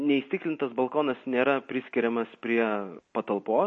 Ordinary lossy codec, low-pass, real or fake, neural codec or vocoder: MP3, 32 kbps; 10.8 kHz; real; none